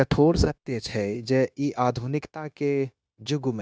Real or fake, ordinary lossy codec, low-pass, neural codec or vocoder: fake; none; none; codec, 16 kHz, 0.9 kbps, LongCat-Audio-Codec